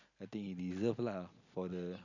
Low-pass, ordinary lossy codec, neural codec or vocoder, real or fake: 7.2 kHz; none; none; real